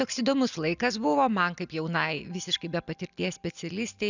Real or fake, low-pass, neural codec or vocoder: real; 7.2 kHz; none